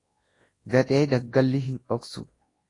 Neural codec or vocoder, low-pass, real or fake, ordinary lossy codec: codec, 24 kHz, 0.9 kbps, WavTokenizer, large speech release; 10.8 kHz; fake; AAC, 32 kbps